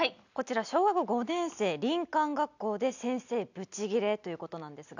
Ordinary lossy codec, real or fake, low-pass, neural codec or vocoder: none; real; 7.2 kHz; none